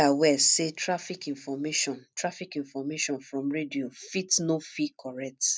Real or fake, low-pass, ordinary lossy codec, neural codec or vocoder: real; none; none; none